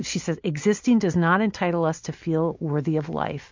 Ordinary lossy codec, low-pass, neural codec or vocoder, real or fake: MP3, 64 kbps; 7.2 kHz; none; real